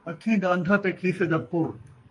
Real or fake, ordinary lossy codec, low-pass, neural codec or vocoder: fake; MP3, 48 kbps; 10.8 kHz; codec, 44.1 kHz, 2.6 kbps, SNAC